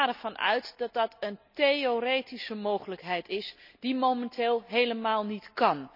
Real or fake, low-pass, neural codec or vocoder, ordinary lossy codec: real; 5.4 kHz; none; none